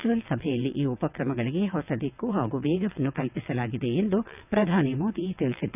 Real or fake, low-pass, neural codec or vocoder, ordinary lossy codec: fake; 3.6 kHz; vocoder, 22.05 kHz, 80 mel bands, WaveNeXt; none